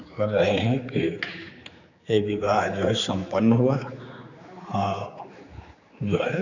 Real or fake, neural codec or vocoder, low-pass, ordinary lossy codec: fake; codec, 16 kHz, 4 kbps, X-Codec, HuBERT features, trained on general audio; 7.2 kHz; none